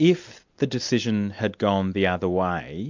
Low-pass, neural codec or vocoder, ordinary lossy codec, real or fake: 7.2 kHz; none; MP3, 64 kbps; real